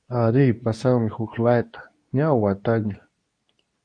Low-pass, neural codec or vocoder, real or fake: 9.9 kHz; codec, 24 kHz, 0.9 kbps, WavTokenizer, medium speech release version 2; fake